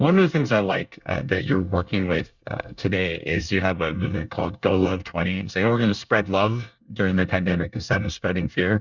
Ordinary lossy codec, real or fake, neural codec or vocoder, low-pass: Opus, 64 kbps; fake; codec, 24 kHz, 1 kbps, SNAC; 7.2 kHz